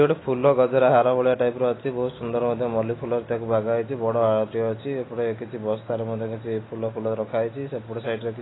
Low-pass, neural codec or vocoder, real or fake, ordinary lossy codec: 7.2 kHz; none; real; AAC, 16 kbps